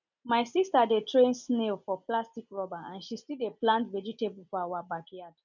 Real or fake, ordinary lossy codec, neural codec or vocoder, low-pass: real; none; none; 7.2 kHz